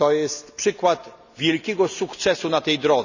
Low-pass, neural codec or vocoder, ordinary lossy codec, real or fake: 7.2 kHz; none; none; real